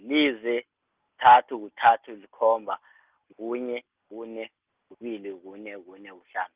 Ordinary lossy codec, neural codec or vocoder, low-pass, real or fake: Opus, 24 kbps; none; 3.6 kHz; real